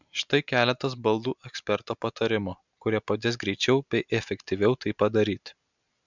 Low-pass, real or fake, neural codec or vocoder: 7.2 kHz; real; none